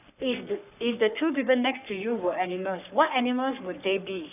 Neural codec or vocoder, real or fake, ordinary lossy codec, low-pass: codec, 44.1 kHz, 3.4 kbps, Pupu-Codec; fake; none; 3.6 kHz